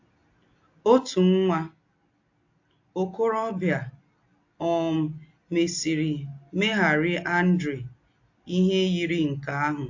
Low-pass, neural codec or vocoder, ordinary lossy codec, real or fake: 7.2 kHz; none; AAC, 48 kbps; real